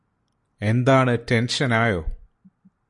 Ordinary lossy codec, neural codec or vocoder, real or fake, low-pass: MP3, 64 kbps; none; real; 10.8 kHz